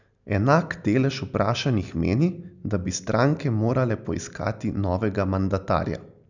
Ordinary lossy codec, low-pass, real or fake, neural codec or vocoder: none; 7.2 kHz; real; none